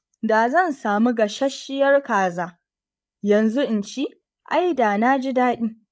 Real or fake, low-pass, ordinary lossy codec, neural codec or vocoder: fake; none; none; codec, 16 kHz, 8 kbps, FreqCodec, larger model